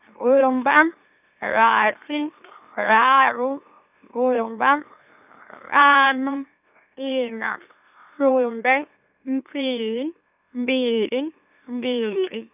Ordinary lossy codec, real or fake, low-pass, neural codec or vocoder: none; fake; 3.6 kHz; autoencoder, 44.1 kHz, a latent of 192 numbers a frame, MeloTTS